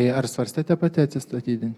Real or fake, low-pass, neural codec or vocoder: real; 19.8 kHz; none